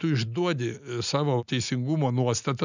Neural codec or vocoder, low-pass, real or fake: none; 7.2 kHz; real